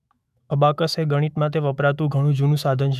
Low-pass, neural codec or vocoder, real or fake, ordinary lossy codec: 14.4 kHz; autoencoder, 48 kHz, 128 numbers a frame, DAC-VAE, trained on Japanese speech; fake; none